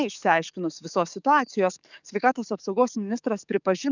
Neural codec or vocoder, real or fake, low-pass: codec, 44.1 kHz, 7.8 kbps, DAC; fake; 7.2 kHz